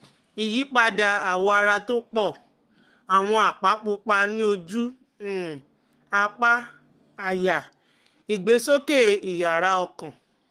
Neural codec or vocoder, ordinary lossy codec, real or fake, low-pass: codec, 32 kHz, 1.9 kbps, SNAC; Opus, 24 kbps; fake; 14.4 kHz